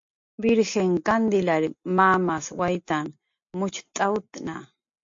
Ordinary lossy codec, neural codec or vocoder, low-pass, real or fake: MP3, 48 kbps; none; 7.2 kHz; real